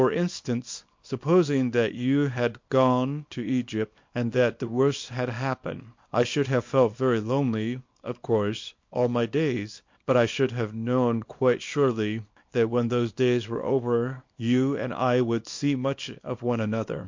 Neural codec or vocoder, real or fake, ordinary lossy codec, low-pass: codec, 24 kHz, 0.9 kbps, WavTokenizer, small release; fake; MP3, 48 kbps; 7.2 kHz